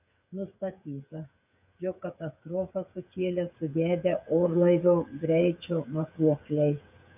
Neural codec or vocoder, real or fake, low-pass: codec, 16 kHz, 8 kbps, FreqCodec, smaller model; fake; 3.6 kHz